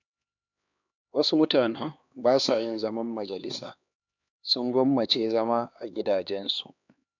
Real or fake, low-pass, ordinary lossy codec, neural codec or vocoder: fake; 7.2 kHz; none; codec, 16 kHz, 2 kbps, X-Codec, HuBERT features, trained on LibriSpeech